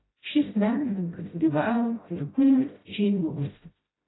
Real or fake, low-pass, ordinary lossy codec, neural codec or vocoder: fake; 7.2 kHz; AAC, 16 kbps; codec, 16 kHz, 0.5 kbps, FreqCodec, smaller model